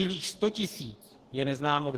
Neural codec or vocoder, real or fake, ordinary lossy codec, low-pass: codec, 44.1 kHz, 2.6 kbps, DAC; fake; Opus, 16 kbps; 14.4 kHz